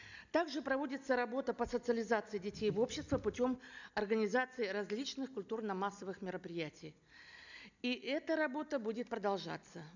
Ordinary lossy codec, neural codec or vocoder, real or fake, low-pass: none; none; real; 7.2 kHz